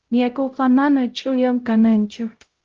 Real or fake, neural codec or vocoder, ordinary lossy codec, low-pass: fake; codec, 16 kHz, 0.5 kbps, X-Codec, HuBERT features, trained on LibriSpeech; Opus, 16 kbps; 7.2 kHz